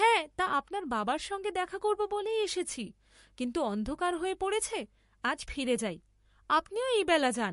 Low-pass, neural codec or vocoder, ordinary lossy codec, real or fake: 14.4 kHz; codec, 44.1 kHz, 7.8 kbps, Pupu-Codec; MP3, 48 kbps; fake